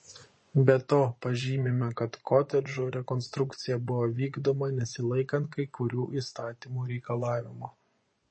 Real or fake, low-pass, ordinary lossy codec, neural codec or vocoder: fake; 9.9 kHz; MP3, 32 kbps; vocoder, 24 kHz, 100 mel bands, Vocos